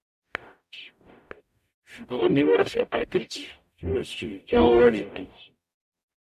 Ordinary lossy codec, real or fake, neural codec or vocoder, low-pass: none; fake; codec, 44.1 kHz, 0.9 kbps, DAC; 14.4 kHz